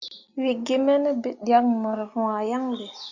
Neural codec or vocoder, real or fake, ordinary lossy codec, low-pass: none; real; Opus, 64 kbps; 7.2 kHz